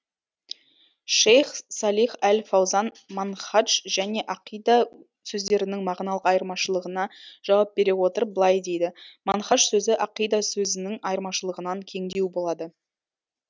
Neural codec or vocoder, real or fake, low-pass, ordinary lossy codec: none; real; none; none